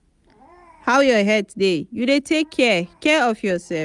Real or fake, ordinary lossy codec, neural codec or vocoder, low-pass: real; none; none; 10.8 kHz